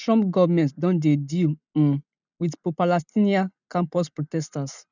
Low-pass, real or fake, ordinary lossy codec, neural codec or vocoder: 7.2 kHz; real; none; none